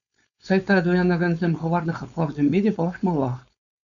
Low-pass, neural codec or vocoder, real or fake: 7.2 kHz; codec, 16 kHz, 4.8 kbps, FACodec; fake